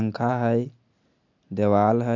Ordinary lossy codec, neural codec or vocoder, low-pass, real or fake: none; none; 7.2 kHz; real